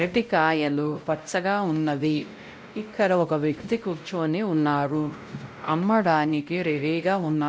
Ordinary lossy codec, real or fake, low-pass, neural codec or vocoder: none; fake; none; codec, 16 kHz, 0.5 kbps, X-Codec, WavLM features, trained on Multilingual LibriSpeech